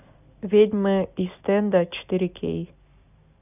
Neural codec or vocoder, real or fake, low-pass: none; real; 3.6 kHz